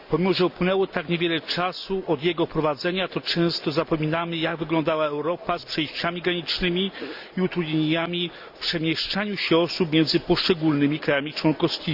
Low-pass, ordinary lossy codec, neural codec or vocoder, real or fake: 5.4 kHz; Opus, 64 kbps; none; real